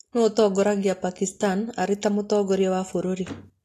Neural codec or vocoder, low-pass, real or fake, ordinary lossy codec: none; 14.4 kHz; real; AAC, 48 kbps